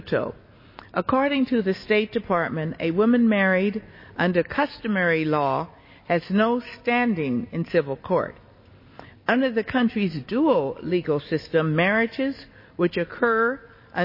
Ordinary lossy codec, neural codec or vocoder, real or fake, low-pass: MP3, 24 kbps; none; real; 5.4 kHz